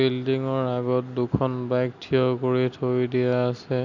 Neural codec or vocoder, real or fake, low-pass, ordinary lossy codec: none; real; 7.2 kHz; none